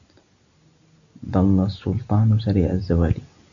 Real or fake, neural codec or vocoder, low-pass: real; none; 7.2 kHz